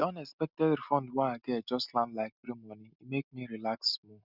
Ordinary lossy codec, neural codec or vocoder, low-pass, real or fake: none; none; 5.4 kHz; real